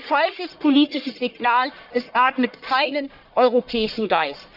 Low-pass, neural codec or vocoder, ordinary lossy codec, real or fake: 5.4 kHz; codec, 44.1 kHz, 1.7 kbps, Pupu-Codec; none; fake